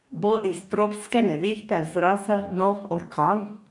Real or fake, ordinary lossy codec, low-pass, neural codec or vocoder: fake; none; 10.8 kHz; codec, 44.1 kHz, 2.6 kbps, DAC